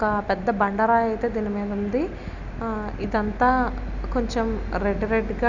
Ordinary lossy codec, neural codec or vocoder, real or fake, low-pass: none; none; real; 7.2 kHz